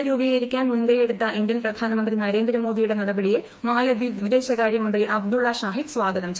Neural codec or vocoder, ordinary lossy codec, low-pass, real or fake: codec, 16 kHz, 2 kbps, FreqCodec, smaller model; none; none; fake